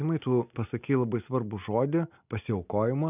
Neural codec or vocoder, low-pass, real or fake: none; 3.6 kHz; real